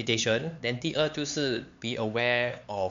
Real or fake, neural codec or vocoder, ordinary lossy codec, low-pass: fake; codec, 16 kHz, 4 kbps, X-Codec, HuBERT features, trained on LibriSpeech; MP3, 64 kbps; 7.2 kHz